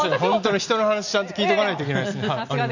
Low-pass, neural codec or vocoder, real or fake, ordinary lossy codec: 7.2 kHz; none; real; none